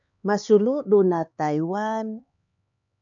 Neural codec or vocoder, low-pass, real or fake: codec, 16 kHz, 4 kbps, X-Codec, HuBERT features, trained on LibriSpeech; 7.2 kHz; fake